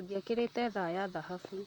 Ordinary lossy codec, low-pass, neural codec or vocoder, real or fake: none; 19.8 kHz; vocoder, 44.1 kHz, 128 mel bands, Pupu-Vocoder; fake